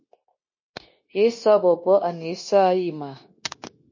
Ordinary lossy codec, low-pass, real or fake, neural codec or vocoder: MP3, 32 kbps; 7.2 kHz; fake; codec, 16 kHz, 0.9 kbps, LongCat-Audio-Codec